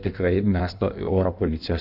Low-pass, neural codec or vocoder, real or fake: 5.4 kHz; codec, 16 kHz in and 24 kHz out, 1.1 kbps, FireRedTTS-2 codec; fake